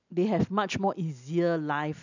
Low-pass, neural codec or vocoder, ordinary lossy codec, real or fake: 7.2 kHz; none; none; real